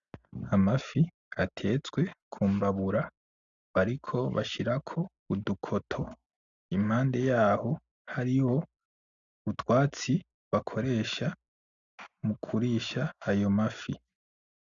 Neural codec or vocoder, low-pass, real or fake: none; 7.2 kHz; real